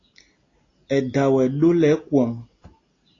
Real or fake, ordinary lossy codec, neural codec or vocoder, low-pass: real; AAC, 32 kbps; none; 7.2 kHz